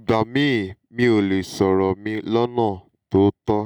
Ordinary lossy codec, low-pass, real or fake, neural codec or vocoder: none; 19.8 kHz; real; none